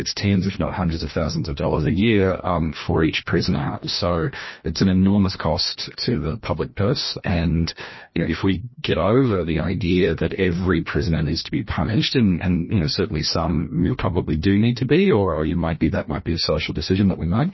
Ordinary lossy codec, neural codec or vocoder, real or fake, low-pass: MP3, 24 kbps; codec, 16 kHz, 1 kbps, FreqCodec, larger model; fake; 7.2 kHz